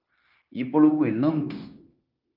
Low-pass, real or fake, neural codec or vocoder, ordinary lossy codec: 5.4 kHz; fake; codec, 16 kHz, 0.9 kbps, LongCat-Audio-Codec; Opus, 24 kbps